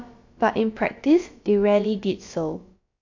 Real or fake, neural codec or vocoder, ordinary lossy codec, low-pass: fake; codec, 16 kHz, about 1 kbps, DyCAST, with the encoder's durations; AAC, 48 kbps; 7.2 kHz